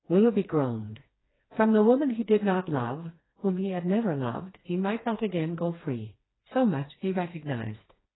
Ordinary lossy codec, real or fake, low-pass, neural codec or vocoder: AAC, 16 kbps; fake; 7.2 kHz; codec, 16 kHz, 2 kbps, FreqCodec, smaller model